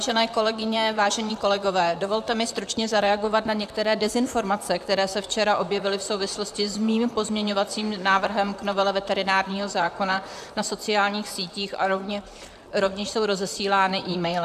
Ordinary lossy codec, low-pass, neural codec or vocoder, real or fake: AAC, 96 kbps; 14.4 kHz; vocoder, 44.1 kHz, 128 mel bands, Pupu-Vocoder; fake